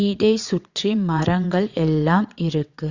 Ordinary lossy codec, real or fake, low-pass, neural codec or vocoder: Opus, 64 kbps; fake; 7.2 kHz; vocoder, 22.05 kHz, 80 mel bands, WaveNeXt